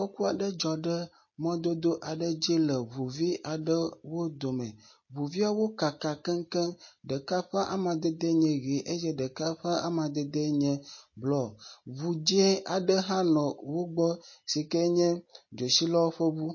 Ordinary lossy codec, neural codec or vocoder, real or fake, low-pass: MP3, 32 kbps; none; real; 7.2 kHz